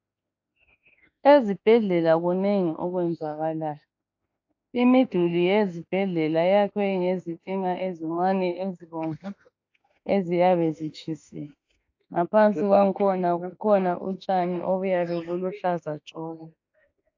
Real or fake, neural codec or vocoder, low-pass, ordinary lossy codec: fake; autoencoder, 48 kHz, 32 numbers a frame, DAC-VAE, trained on Japanese speech; 7.2 kHz; AAC, 48 kbps